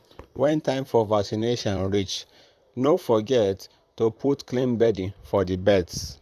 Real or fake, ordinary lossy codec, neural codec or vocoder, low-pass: fake; none; vocoder, 44.1 kHz, 128 mel bands, Pupu-Vocoder; 14.4 kHz